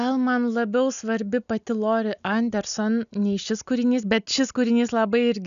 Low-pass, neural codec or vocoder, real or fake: 7.2 kHz; none; real